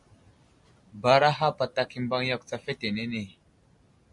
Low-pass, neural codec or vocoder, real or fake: 10.8 kHz; none; real